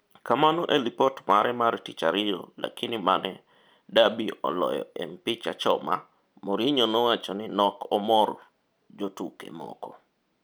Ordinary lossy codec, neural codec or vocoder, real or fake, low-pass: none; none; real; none